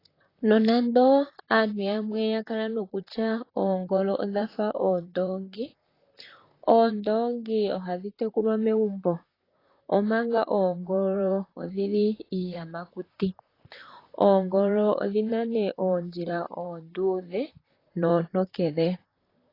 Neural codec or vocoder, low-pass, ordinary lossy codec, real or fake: vocoder, 44.1 kHz, 128 mel bands, Pupu-Vocoder; 5.4 kHz; AAC, 24 kbps; fake